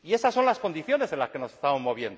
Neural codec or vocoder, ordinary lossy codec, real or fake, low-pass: none; none; real; none